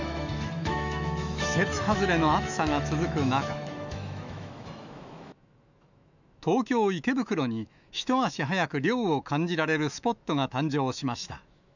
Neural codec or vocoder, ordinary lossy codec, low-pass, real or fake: autoencoder, 48 kHz, 128 numbers a frame, DAC-VAE, trained on Japanese speech; none; 7.2 kHz; fake